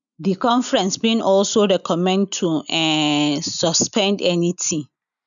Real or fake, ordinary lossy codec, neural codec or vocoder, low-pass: real; none; none; 7.2 kHz